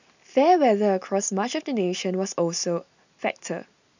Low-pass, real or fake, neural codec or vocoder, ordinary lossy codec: 7.2 kHz; real; none; none